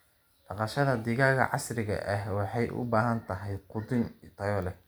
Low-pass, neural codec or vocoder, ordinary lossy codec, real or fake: none; none; none; real